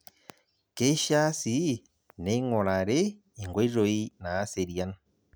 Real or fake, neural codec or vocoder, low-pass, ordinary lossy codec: real; none; none; none